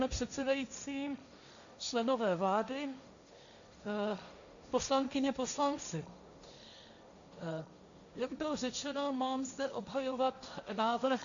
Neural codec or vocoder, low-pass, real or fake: codec, 16 kHz, 1.1 kbps, Voila-Tokenizer; 7.2 kHz; fake